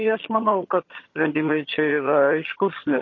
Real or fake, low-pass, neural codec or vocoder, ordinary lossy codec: fake; 7.2 kHz; vocoder, 22.05 kHz, 80 mel bands, HiFi-GAN; MP3, 32 kbps